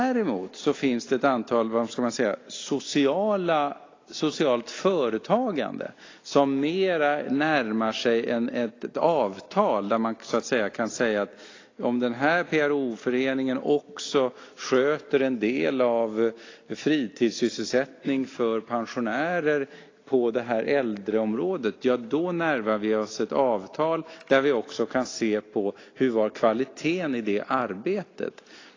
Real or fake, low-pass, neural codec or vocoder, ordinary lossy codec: real; 7.2 kHz; none; AAC, 32 kbps